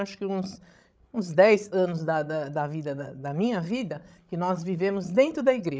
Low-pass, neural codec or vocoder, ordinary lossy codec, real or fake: none; codec, 16 kHz, 16 kbps, FreqCodec, larger model; none; fake